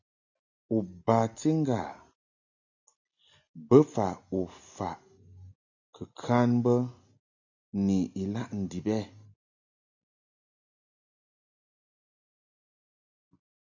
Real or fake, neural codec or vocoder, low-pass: real; none; 7.2 kHz